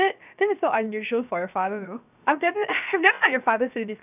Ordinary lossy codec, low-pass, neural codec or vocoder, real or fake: none; 3.6 kHz; codec, 16 kHz, 0.7 kbps, FocalCodec; fake